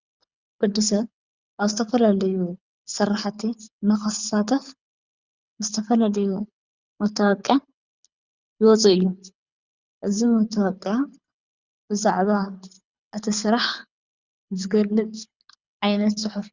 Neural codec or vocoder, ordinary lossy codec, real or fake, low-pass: codec, 24 kHz, 6 kbps, HILCodec; Opus, 64 kbps; fake; 7.2 kHz